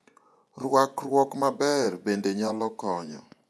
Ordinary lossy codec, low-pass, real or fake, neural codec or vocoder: none; none; fake; vocoder, 24 kHz, 100 mel bands, Vocos